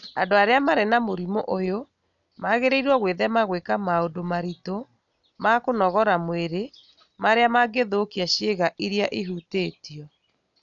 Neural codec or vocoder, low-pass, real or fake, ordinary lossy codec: none; 7.2 kHz; real; none